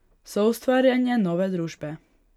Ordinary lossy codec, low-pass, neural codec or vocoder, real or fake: none; 19.8 kHz; none; real